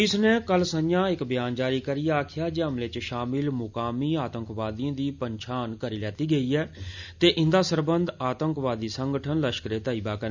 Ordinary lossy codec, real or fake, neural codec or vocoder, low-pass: none; real; none; 7.2 kHz